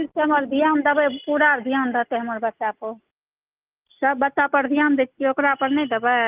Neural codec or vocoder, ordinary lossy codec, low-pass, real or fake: none; Opus, 32 kbps; 3.6 kHz; real